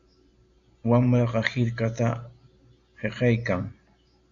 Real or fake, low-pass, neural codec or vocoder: real; 7.2 kHz; none